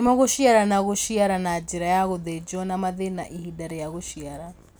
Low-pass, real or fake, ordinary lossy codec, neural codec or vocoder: none; real; none; none